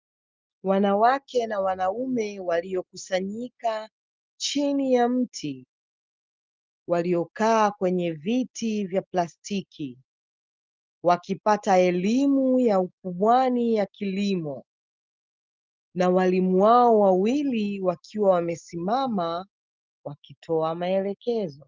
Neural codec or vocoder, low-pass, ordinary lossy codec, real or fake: none; 7.2 kHz; Opus, 32 kbps; real